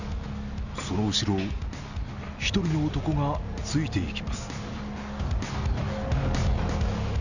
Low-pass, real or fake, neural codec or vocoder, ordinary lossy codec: 7.2 kHz; real; none; none